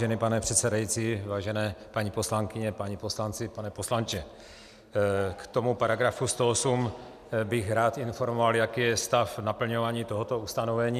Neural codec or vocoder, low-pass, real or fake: none; 14.4 kHz; real